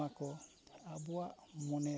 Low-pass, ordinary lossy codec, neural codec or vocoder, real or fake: none; none; none; real